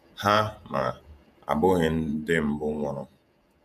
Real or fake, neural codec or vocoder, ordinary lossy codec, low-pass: fake; vocoder, 48 kHz, 128 mel bands, Vocos; none; 14.4 kHz